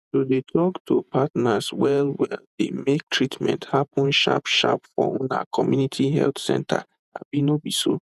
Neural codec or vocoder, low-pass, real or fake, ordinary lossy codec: vocoder, 48 kHz, 128 mel bands, Vocos; 14.4 kHz; fake; none